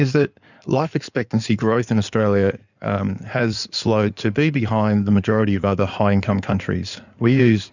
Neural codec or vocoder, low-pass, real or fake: codec, 16 kHz in and 24 kHz out, 2.2 kbps, FireRedTTS-2 codec; 7.2 kHz; fake